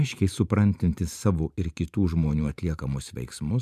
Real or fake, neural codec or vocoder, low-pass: fake; vocoder, 44.1 kHz, 128 mel bands every 512 samples, BigVGAN v2; 14.4 kHz